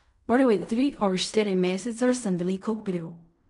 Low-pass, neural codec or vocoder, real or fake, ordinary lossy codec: 10.8 kHz; codec, 16 kHz in and 24 kHz out, 0.4 kbps, LongCat-Audio-Codec, fine tuned four codebook decoder; fake; none